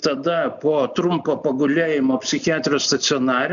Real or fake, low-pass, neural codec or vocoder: real; 7.2 kHz; none